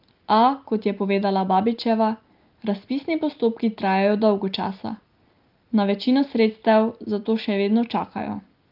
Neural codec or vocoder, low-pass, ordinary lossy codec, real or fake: none; 5.4 kHz; Opus, 24 kbps; real